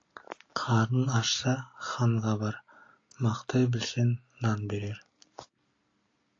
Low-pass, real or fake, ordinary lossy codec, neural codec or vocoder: 7.2 kHz; real; AAC, 48 kbps; none